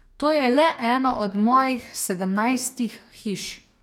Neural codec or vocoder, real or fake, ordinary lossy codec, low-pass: codec, 44.1 kHz, 2.6 kbps, DAC; fake; none; 19.8 kHz